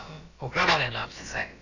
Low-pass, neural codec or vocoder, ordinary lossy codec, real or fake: 7.2 kHz; codec, 16 kHz, about 1 kbps, DyCAST, with the encoder's durations; none; fake